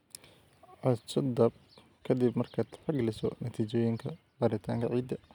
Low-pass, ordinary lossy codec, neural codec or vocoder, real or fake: 19.8 kHz; none; none; real